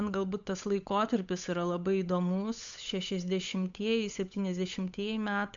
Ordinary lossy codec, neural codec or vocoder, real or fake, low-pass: MP3, 64 kbps; codec, 16 kHz, 8 kbps, FunCodec, trained on Chinese and English, 25 frames a second; fake; 7.2 kHz